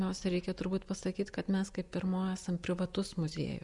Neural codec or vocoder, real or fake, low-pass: none; real; 10.8 kHz